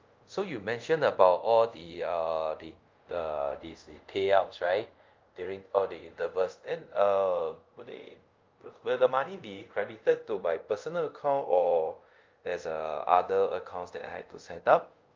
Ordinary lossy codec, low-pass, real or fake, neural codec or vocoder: Opus, 32 kbps; 7.2 kHz; fake; codec, 24 kHz, 0.5 kbps, DualCodec